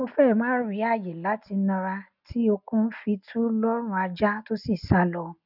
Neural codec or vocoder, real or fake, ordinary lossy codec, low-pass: none; real; none; 5.4 kHz